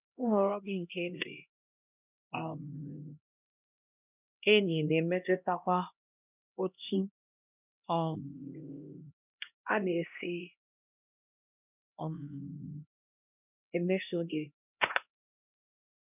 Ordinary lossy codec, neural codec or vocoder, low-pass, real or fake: none; codec, 16 kHz, 1 kbps, X-Codec, HuBERT features, trained on LibriSpeech; 3.6 kHz; fake